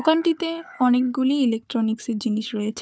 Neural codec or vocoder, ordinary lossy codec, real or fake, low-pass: codec, 16 kHz, 4 kbps, FunCodec, trained on Chinese and English, 50 frames a second; none; fake; none